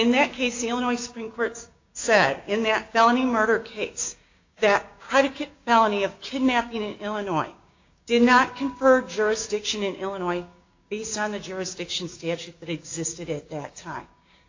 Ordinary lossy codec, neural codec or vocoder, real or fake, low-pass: AAC, 48 kbps; codec, 16 kHz, 6 kbps, DAC; fake; 7.2 kHz